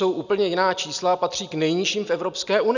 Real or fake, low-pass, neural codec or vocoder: real; 7.2 kHz; none